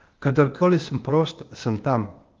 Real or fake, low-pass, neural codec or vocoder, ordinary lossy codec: fake; 7.2 kHz; codec, 16 kHz, 0.8 kbps, ZipCodec; Opus, 24 kbps